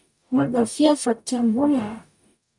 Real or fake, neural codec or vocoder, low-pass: fake; codec, 44.1 kHz, 0.9 kbps, DAC; 10.8 kHz